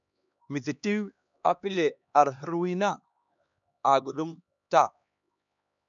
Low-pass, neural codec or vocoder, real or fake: 7.2 kHz; codec, 16 kHz, 2 kbps, X-Codec, HuBERT features, trained on LibriSpeech; fake